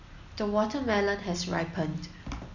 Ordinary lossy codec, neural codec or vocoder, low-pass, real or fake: none; none; 7.2 kHz; real